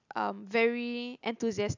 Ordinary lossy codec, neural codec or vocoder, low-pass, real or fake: none; none; 7.2 kHz; real